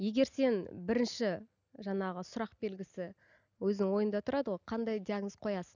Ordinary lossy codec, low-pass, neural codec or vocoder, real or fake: none; 7.2 kHz; none; real